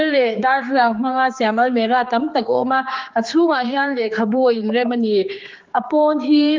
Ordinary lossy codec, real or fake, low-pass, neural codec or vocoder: Opus, 32 kbps; fake; 7.2 kHz; codec, 16 kHz, 4 kbps, X-Codec, HuBERT features, trained on general audio